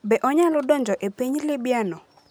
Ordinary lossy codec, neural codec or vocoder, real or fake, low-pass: none; none; real; none